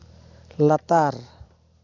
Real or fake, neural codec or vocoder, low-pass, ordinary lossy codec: real; none; 7.2 kHz; AAC, 48 kbps